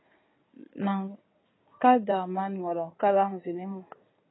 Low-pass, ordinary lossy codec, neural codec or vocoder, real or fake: 7.2 kHz; AAC, 16 kbps; codec, 16 kHz in and 24 kHz out, 2.2 kbps, FireRedTTS-2 codec; fake